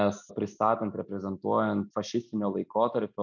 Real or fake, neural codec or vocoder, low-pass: real; none; 7.2 kHz